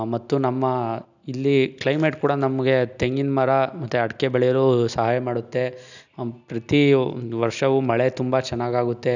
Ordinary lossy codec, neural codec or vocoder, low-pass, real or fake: none; none; 7.2 kHz; real